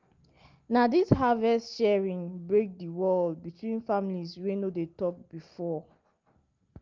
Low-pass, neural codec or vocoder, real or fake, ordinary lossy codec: 7.2 kHz; none; real; Opus, 32 kbps